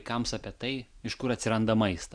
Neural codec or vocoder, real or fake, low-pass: none; real; 9.9 kHz